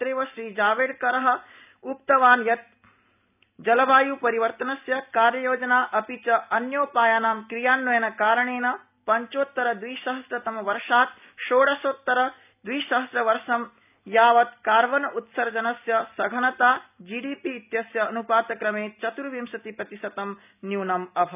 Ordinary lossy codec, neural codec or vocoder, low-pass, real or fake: none; none; 3.6 kHz; real